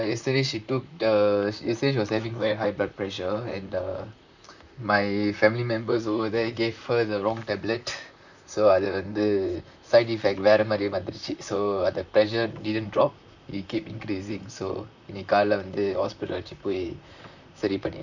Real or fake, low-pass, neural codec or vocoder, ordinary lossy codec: fake; 7.2 kHz; vocoder, 44.1 kHz, 128 mel bands, Pupu-Vocoder; none